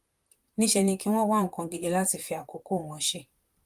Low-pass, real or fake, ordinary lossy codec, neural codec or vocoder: 14.4 kHz; fake; Opus, 32 kbps; vocoder, 44.1 kHz, 128 mel bands, Pupu-Vocoder